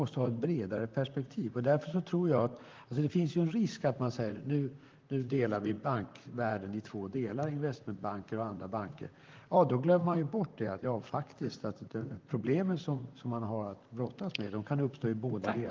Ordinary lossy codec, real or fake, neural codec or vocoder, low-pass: Opus, 32 kbps; fake; vocoder, 44.1 kHz, 128 mel bands, Pupu-Vocoder; 7.2 kHz